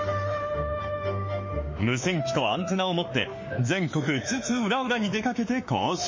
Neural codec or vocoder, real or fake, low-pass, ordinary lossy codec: autoencoder, 48 kHz, 32 numbers a frame, DAC-VAE, trained on Japanese speech; fake; 7.2 kHz; MP3, 32 kbps